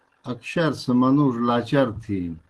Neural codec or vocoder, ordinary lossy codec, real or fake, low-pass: none; Opus, 16 kbps; real; 10.8 kHz